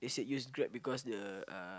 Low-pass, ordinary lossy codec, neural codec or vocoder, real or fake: none; none; none; real